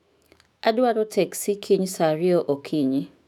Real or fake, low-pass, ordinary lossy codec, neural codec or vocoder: fake; 19.8 kHz; none; autoencoder, 48 kHz, 128 numbers a frame, DAC-VAE, trained on Japanese speech